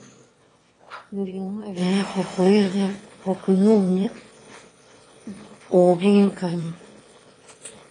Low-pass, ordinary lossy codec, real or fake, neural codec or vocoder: 9.9 kHz; AAC, 32 kbps; fake; autoencoder, 22.05 kHz, a latent of 192 numbers a frame, VITS, trained on one speaker